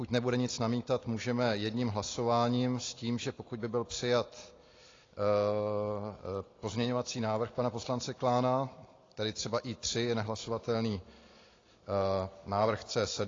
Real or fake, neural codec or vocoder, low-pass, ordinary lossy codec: real; none; 7.2 kHz; AAC, 32 kbps